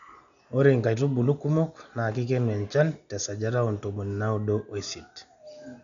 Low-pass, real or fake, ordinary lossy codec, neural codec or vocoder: 7.2 kHz; real; none; none